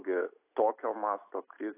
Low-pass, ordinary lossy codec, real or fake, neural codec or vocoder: 3.6 kHz; AAC, 24 kbps; real; none